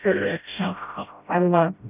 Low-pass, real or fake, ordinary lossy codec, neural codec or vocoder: 3.6 kHz; fake; none; codec, 16 kHz, 0.5 kbps, FreqCodec, smaller model